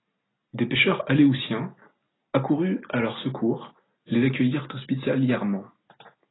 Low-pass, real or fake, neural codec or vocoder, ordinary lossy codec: 7.2 kHz; real; none; AAC, 16 kbps